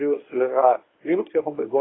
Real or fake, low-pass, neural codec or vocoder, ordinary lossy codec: fake; 7.2 kHz; codec, 24 kHz, 0.9 kbps, WavTokenizer, medium speech release version 1; AAC, 16 kbps